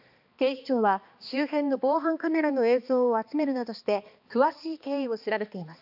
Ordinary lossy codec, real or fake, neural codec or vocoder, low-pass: AAC, 48 kbps; fake; codec, 16 kHz, 2 kbps, X-Codec, HuBERT features, trained on balanced general audio; 5.4 kHz